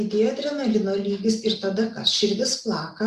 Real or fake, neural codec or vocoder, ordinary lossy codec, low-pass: real; none; Opus, 64 kbps; 14.4 kHz